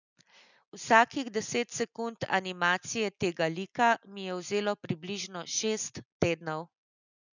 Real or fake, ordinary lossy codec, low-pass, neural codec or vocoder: real; none; 7.2 kHz; none